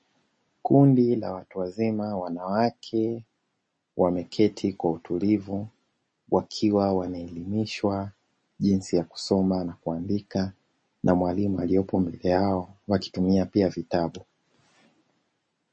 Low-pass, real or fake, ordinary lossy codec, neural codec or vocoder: 9.9 kHz; real; MP3, 32 kbps; none